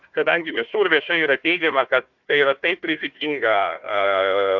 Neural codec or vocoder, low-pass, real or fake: codec, 16 kHz, 1 kbps, FunCodec, trained on Chinese and English, 50 frames a second; 7.2 kHz; fake